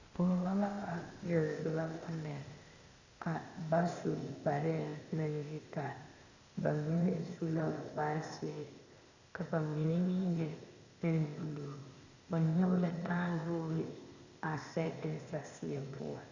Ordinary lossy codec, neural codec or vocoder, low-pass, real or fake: Opus, 64 kbps; codec, 16 kHz, 0.8 kbps, ZipCodec; 7.2 kHz; fake